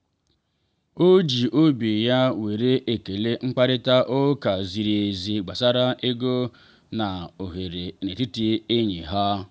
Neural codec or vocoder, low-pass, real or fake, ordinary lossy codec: none; none; real; none